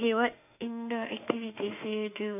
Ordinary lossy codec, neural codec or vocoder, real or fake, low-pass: none; autoencoder, 48 kHz, 32 numbers a frame, DAC-VAE, trained on Japanese speech; fake; 3.6 kHz